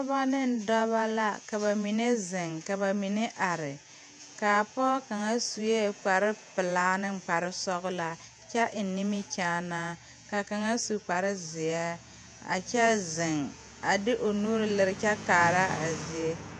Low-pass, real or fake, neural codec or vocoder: 10.8 kHz; fake; vocoder, 48 kHz, 128 mel bands, Vocos